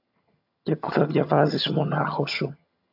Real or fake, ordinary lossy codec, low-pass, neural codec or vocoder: fake; AAC, 48 kbps; 5.4 kHz; vocoder, 22.05 kHz, 80 mel bands, HiFi-GAN